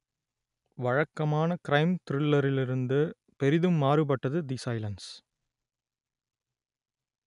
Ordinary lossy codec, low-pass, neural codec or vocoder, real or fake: none; 10.8 kHz; none; real